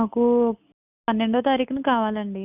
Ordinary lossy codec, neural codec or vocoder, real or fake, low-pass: none; none; real; 3.6 kHz